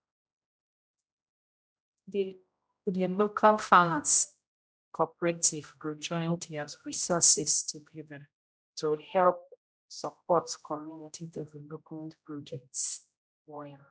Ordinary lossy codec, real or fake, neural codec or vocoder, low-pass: none; fake; codec, 16 kHz, 0.5 kbps, X-Codec, HuBERT features, trained on general audio; none